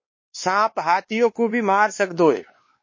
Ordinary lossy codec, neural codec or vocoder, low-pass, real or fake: MP3, 32 kbps; codec, 16 kHz, 2 kbps, X-Codec, WavLM features, trained on Multilingual LibriSpeech; 7.2 kHz; fake